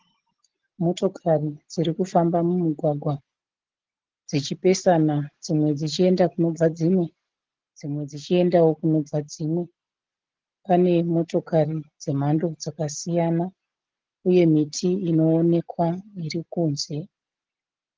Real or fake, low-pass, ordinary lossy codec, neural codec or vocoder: real; 7.2 kHz; Opus, 16 kbps; none